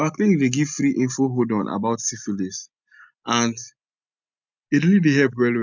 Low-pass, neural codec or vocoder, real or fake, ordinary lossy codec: 7.2 kHz; none; real; none